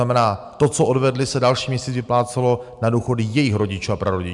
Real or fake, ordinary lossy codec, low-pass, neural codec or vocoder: real; MP3, 96 kbps; 10.8 kHz; none